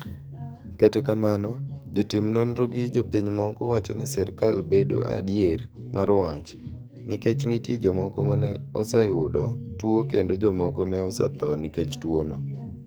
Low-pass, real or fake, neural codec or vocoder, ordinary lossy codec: none; fake; codec, 44.1 kHz, 2.6 kbps, SNAC; none